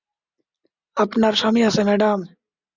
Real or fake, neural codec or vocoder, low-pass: real; none; 7.2 kHz